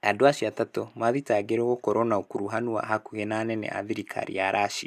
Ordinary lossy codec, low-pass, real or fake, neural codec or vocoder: MP3, 96 kbps; 14.4 kHz; real; none